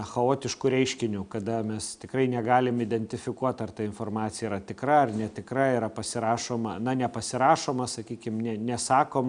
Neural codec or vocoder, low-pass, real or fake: none; 9.9 kHz; real